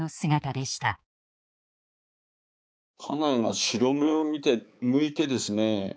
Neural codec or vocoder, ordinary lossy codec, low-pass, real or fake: codec, 16 kHz, 4 kbps, X-Codec, HuBERT features, trained on balanced general audio; none; none; fake